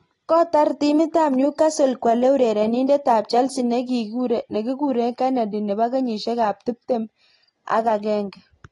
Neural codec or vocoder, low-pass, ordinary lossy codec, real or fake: none; 10.8 kHz; AAC, 32 kbps; real